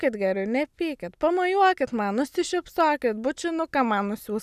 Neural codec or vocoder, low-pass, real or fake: none; 14.4 kHz; real